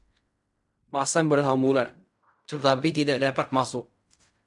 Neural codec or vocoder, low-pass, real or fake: codec, 16 kHz in and 24 kHz out, 0.4 kbps, LongCat-Audio-Codec, fine tuned four codebook decoder; 10.8 kHz; fake